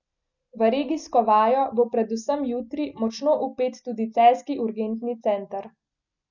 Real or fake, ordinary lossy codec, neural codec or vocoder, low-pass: real; none; none; 7.2 kHz